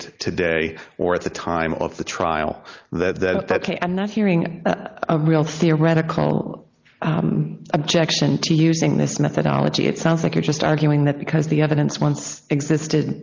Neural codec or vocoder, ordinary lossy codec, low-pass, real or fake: none; Opus, 32 kbps; 7.2 kHz; real